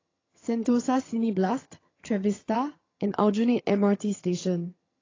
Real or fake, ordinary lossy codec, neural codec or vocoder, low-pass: fake; AAC, 32 kbps; vocoder, 22.05 kHz, 80 mel bands, HiFi-GAN; 7.2 kHz